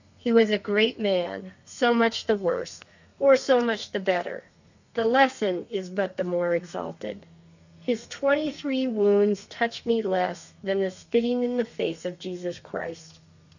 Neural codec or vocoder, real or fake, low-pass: codec, 32 kHz, 1.9 kbps, SNAC; fake; 7.2 kHz